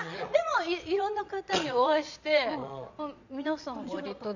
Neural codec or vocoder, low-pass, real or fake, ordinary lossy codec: vocoder, 22.05 kHz, 80 mel bands, Vocos; 7.2 kHz; fake; none